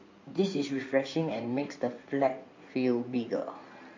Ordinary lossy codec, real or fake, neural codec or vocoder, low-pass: MP3, 48 kbps; fake; codec, 16 kHz in and 24 kHz out, 2.2 kbps, FireRedTTS-2 codec; 7.2 kHz